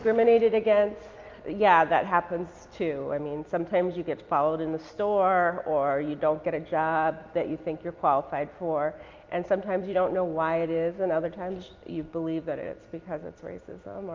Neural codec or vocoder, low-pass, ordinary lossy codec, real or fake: none; 7.2 kHz; Opus, 24 kbps; real